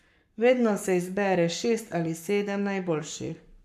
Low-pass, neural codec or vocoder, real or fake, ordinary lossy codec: 14.4 kHz; codec, 44.1 kHz, 7.8 kbps, Pupu-Codec; fake; none